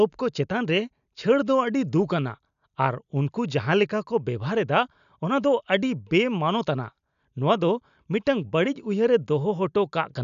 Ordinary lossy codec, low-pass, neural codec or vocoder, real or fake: none; 7.2 kHz; none; real